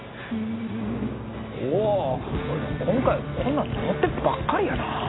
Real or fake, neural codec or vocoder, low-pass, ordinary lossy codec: real; none; 7.2 kHz; AAC, 16 kbps